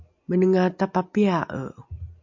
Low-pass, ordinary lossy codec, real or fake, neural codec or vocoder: 7.2 kHz; MP3, 48 kbps; real; none